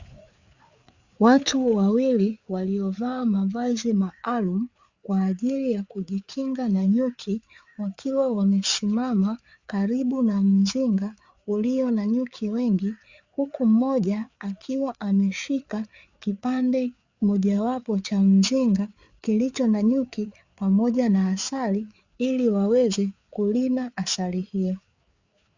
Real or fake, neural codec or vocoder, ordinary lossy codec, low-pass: fake; codec, 16 kHz, 4 kbps, FreqCodec, larger model; Opus, 64 kbps; 7.2 kHz